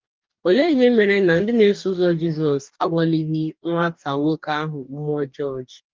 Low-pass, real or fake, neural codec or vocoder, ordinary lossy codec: 7.2 kHz; fake; codec, 44.1 kHz, 2.6 kbps, DAC; Opus, 32 kbps